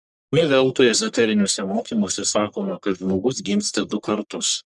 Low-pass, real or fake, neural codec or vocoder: 10.8 kHz; fake; codec, 44.1 kHz, 1.7 kbps, Pupu-Codec